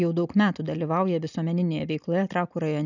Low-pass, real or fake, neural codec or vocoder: 7.2 kHz; real; none